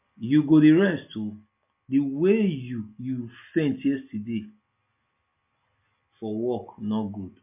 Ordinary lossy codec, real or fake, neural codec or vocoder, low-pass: MP3, 32 kbps; real; none; 3.6 kHz